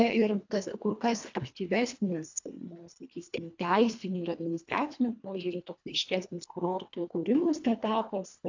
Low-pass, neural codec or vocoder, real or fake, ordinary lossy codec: 7.2 kHz; codec, 24 kHz, 1.5 kbps, HILCodec; fake; AAC, 48 kbps